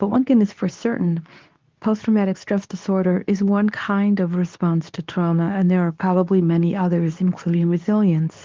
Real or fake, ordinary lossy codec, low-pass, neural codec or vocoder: fake; Opus, 24 kbps; 7.2 kHz; codec, 24 kHz, 0.9 kbps, WavTokenizer, medium speech release version 2